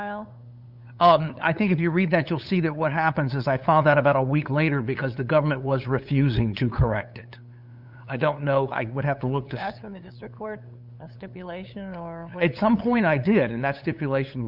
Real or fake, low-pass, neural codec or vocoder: fake; 5.4 kHz; codec, 16 kHz, 8 kbps, FunCodec, trained on LibriTTS, 25 frames a second